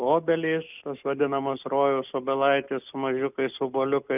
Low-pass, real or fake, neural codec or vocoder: 3.6 kHz; real; none